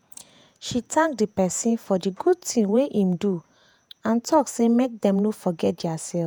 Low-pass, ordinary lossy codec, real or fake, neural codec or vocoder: none; none; fake; vocoder, 48 kHz, 128 mel bands, Vocos